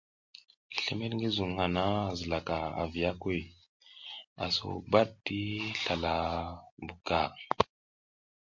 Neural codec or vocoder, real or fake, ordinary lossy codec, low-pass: none; real; MP3, 48 kbps; 7.2 kHz